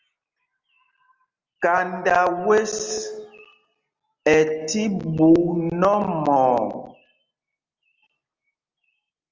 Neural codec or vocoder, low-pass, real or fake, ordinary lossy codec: none; 7.2 kHz; real; Opus, 32 kbps